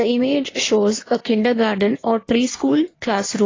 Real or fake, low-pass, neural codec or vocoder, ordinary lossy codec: fake; 7.2 kHz; codec, 16 kHz in and 24 kHz out, 1.1 kbps, FireRedTTS-2 codec; AAC, 32 kbps